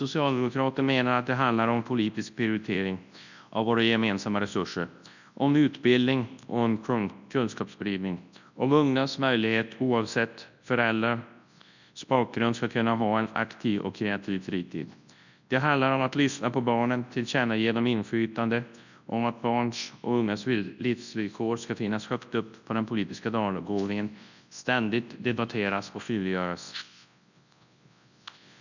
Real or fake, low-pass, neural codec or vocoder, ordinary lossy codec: fake; 7.2 kHz; codec, 24 kHz, 0.9 kbps, WavTokenizer, large speech release; none